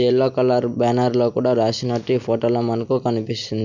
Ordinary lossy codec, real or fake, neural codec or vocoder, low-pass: none; real; none; 7.2 kHz